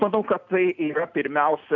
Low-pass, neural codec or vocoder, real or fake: 7.2 kHz; none; real